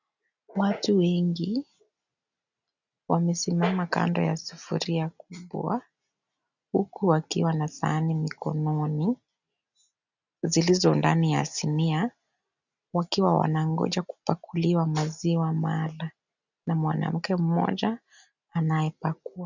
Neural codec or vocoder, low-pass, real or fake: none; 7.2 kHz; real